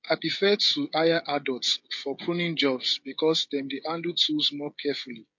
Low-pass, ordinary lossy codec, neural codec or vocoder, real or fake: 7.2 kHz; MP3, 48 kbps; codec, 16 kHz, 16 kbps, FreqCodec, smaller model; fake